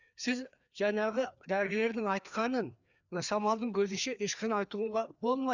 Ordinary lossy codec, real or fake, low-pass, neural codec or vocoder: none; fake; 7.2 kHz; codec, 16 kHz, 2 kbps, FreqCodec, larger model